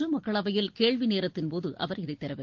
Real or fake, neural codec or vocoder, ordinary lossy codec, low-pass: real; none; Opus, 16 kbps; 7.2 kHz